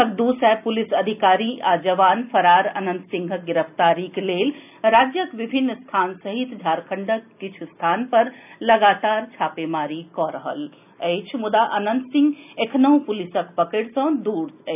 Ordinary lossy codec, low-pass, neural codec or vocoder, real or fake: none; 3.6 kHz; none; real